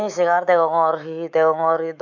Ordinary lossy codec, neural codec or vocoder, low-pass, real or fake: none; autoencoder, 48 kHz, 128 numbers a frame, DAC-VAE, trained on Japanese speech; 7.2 kHz; fake